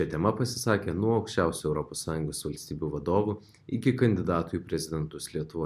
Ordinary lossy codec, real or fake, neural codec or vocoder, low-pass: MP3, 96 kbps; real; none; 14.4 kHz